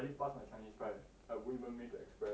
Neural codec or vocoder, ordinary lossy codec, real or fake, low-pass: none; none; real; none